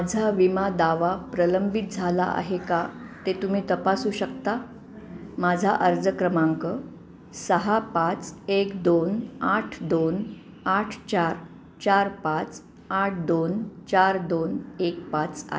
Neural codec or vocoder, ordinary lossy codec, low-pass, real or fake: none; none; none; real